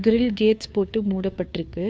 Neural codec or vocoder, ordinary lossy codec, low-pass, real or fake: codec, 16 kHz, 2 kbps, FunCodec, trained on Chinese and English, 25 frames a second; none; none; fake